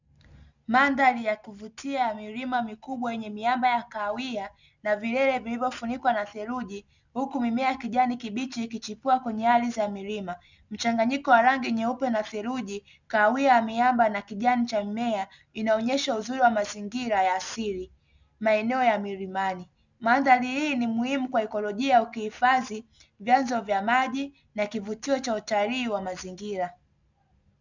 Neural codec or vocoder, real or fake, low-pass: none; real; 7.2 kHz